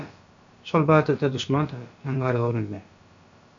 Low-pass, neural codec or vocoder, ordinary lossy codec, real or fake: 7.2 kHz; codec, 16 kHz, about 1 kbps, DyCAST, with the encoder's durations; AAC, 64 kbps; fake